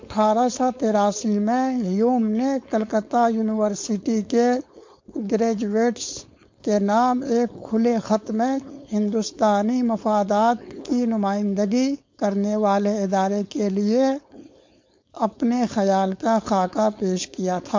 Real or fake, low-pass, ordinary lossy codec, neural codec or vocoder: fake; 7.2 kHz; MP3, 48 kbps; codec, 16 kHz, 4.8 kbps, FACodec